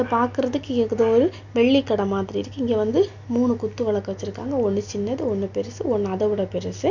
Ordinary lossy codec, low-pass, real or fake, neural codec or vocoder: none; 7.2 kHz; real; none